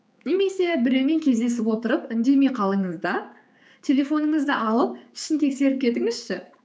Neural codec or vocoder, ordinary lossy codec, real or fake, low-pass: codec, 16 kHz, 4 kbps, X-Codec, HuBERT features, trained on general audio; none; fake; none